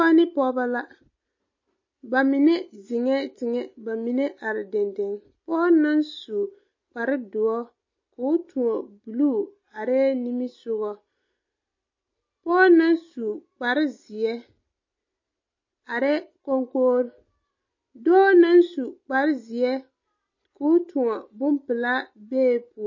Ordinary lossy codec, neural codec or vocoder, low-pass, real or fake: MP3, 32 kbps; none; 7.2 kHz; real